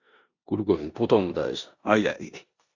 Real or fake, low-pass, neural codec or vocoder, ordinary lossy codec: fake; 7.2 kHz; codec, 16 kHz in and 24 kHz out, 0.9 kbps, LongCat-Audio-Codec, four codebook decoder; Opus, 64 kbps